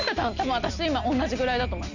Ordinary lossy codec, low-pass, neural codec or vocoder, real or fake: none; 7.2 kHz; none; real